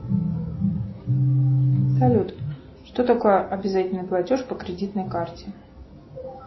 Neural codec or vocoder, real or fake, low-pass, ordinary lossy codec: none; real; 7.2 kHz; MP3, 24 kbps